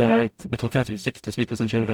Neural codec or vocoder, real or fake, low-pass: codec, 44.1 kHz, 0.9 kbps, DAC; fake; 19.8 kHz